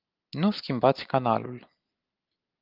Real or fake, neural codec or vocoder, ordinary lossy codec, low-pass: real; none; Opus, 32 kbps; 5.4 kHz